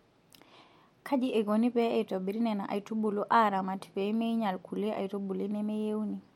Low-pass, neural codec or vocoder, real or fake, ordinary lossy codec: 19.8 kHz; none; real; MP3, 64 kbps